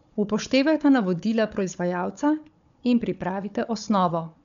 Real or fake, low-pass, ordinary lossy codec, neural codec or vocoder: fake; 7.2 kHz; none; codec, 16 kHz, 4 kbps, FunCodec, trained on Chinese and English, 50 frames a second